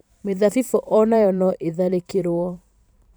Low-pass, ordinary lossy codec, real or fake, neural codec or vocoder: none; none; fake; vocoder, 44.1 kHz, 128 mel bands every 512 samples, BigVGAN v2